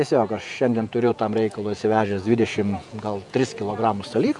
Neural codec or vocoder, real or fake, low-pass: vocoder, 48 kHz, 128 mel bands, Vocos; fake; 10.8 kHz